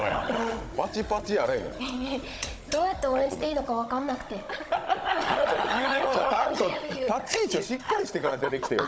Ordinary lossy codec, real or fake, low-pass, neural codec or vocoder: none; fake; none; codec, 16 kHz, 16 kbps, FunCodec, trained on Chinese and English, 50 frames a second